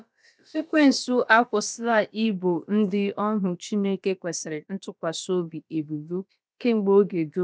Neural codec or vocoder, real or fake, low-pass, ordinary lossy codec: codec, 16 kHz, about 1 kbps, DyCAST, with the encoder's durations; fake; none; none